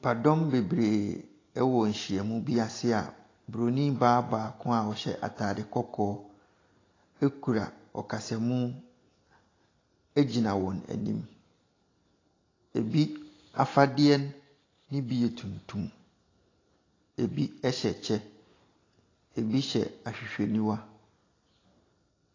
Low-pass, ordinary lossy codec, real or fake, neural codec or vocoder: 7.2 kHz; AAC, 32 kbps; real; none